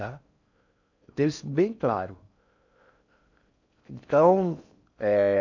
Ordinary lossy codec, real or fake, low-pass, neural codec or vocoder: none; fake; 7.2 kHz; codec, 16 kHz in and 24 kHz out, 0.6 kbps, FocalCodec, streaming, 4096 codes